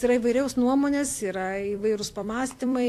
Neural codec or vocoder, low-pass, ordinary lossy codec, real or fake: vocoder, 44.1 kHz, 128 mel bands every 256 samples, BigVGAN v2; 14.4 kHz; AAC, 64 kbps; fake